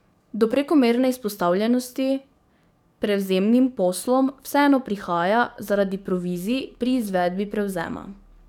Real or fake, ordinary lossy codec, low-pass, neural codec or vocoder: fake; none; 19.8 kHz; codec, 44.1 kHz, 7.8 kbps, DAC